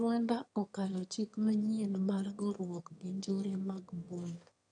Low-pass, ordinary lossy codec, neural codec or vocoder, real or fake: 9.9 kHz; none; autoencoder, 22.05 kHz, a latent of 192 numbers a frame, VITS, trained on one speaker; fake